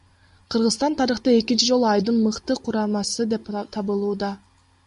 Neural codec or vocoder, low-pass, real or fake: none; 10.8 kHz; real